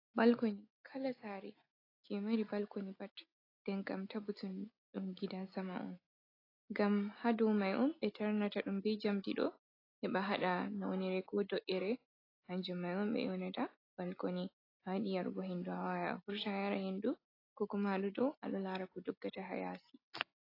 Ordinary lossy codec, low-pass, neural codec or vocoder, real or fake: AAC, 24 kbps; 5.4 kHz; none; real